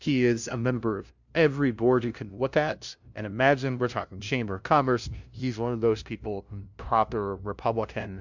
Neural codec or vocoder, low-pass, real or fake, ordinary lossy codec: codec, 16 kHz, 0.5 kbps, FunCodec, trained on LibriTTS, 25 frames a second; 7.2 kHz; fake; MP3, 64 kbps